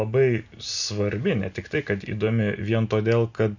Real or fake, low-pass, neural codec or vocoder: real; 7.2 kHz; none